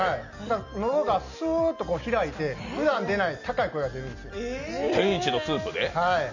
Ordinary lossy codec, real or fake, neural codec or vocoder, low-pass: none; real; none; 7.2 kHz